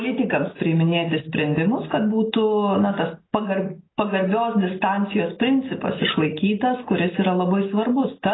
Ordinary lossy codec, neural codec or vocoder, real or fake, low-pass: AAC, 16 kbps; none; real; 7.2 kHz